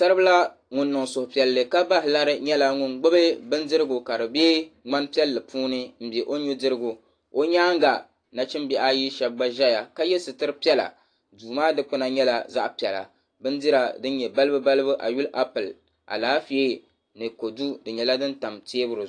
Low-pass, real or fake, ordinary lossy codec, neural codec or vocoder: 9.9 kHz; real; AAC, 48 kbps; none